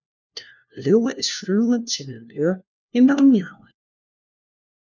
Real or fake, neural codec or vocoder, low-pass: fake; codec, 16 kHz, 1 kbps, FunCodec, trained on LibriTTS, 50 frames a second; 7.2 kHz